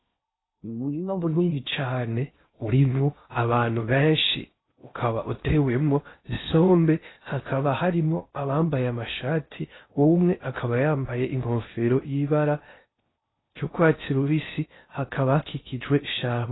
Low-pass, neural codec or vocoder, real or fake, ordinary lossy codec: 7.2 kHz; codec, 16 kHz in and 24 kHz out, 0.6 kbps, FocalCodec, streaming, 4096 codes; fake; AAC, 16 kbps